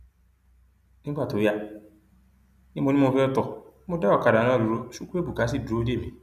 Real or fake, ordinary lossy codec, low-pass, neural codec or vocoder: real; none; 14.4 kHz; none